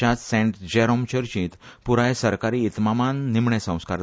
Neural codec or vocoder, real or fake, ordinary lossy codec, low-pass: none; real; none; none